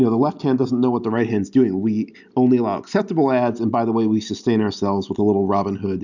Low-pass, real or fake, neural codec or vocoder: 7.2 kHz; real; none